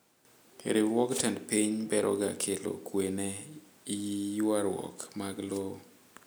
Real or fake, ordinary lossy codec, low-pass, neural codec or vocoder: real; none; none; none